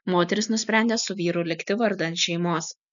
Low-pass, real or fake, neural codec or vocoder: 7.2 kHz; real; none